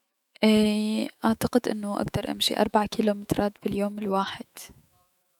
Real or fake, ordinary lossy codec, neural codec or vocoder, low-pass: fake; none; autoencoder, 48 kHz, 128 numbers a frame, DAC-VAE, trained on Japanese speech; 19.8 kHz